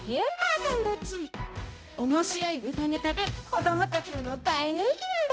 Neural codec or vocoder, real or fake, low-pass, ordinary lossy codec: codec, 16 kHz, 0.5 kbps, X-Codec, HuBERT features, trained on balanced general audio; fake; none; none